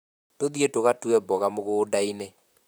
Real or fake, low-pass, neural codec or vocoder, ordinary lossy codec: fake; none; vocoder, 44.1 kHz, 128 mel bands, Pupu-Vocoder; none